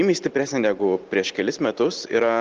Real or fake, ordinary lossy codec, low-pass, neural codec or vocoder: real; Opus, 16 kbps; 7.2 kHz; none